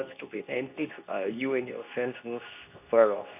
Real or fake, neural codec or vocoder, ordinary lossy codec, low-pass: fake; codec, 24 kHz, 0.9 kbps, WavTokenizer, medium speech release version 2; none; 3.6 kHz